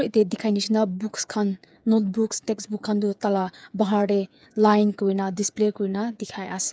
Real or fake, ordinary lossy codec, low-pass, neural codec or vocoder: fake; none; none; codec, 16 kHz, 8 kbps, FreqCodec, smaller model